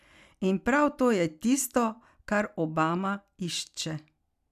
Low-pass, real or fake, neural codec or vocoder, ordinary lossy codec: 14.4 kHz; real; none; none